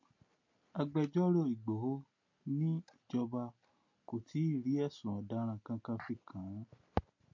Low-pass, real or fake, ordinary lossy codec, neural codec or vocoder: 7.2 kHz; real; MP3, 48 kbps; none